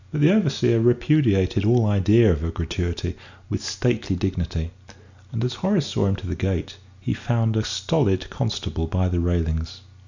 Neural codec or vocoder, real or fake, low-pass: none; real; 7.2 kHz